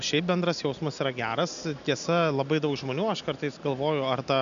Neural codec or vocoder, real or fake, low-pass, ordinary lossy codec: none; real; 7.2 kHz; MP3, 96 kbps